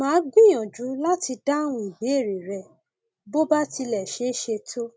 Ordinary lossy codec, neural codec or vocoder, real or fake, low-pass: none; none; real; none